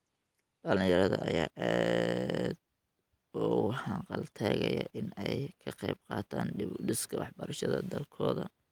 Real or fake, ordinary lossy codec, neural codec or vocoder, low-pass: real; Opus, 32 kbps; none; 19.8 kHz